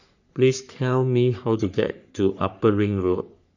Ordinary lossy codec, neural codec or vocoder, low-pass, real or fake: none; codec, 44.1 kHz, 3.4 kbps, Pupu-Codec; 7.2 kHz; fake